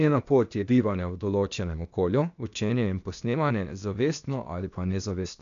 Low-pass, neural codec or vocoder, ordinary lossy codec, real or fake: 7.2 kHz; codec, 16 kHz, 0.8 kbps, ZipCodec; none; fake